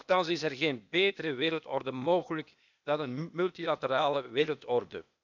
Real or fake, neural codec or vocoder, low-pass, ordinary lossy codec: fake; codec, 16 kHz, 0.8 kbps, ZipCodec; 7.2 kHz; none